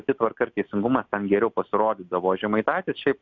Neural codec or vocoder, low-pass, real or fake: none; 7.2 kHz; real